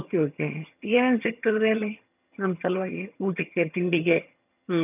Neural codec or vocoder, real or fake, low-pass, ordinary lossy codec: vocoder, 22.05 kHz, 80 mel bands, HiFi-GAN; fake; 3.6 kHz; none